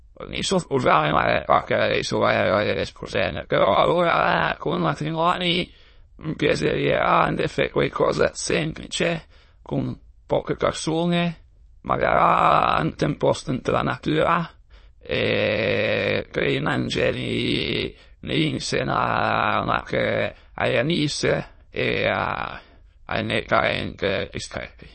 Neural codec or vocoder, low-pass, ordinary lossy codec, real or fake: autoencoder, 22.05 kHz, a latent of 192 numbers a frame, VITS, trained on many speakers; 9.9 kHz; MP3, 32 kbps; fake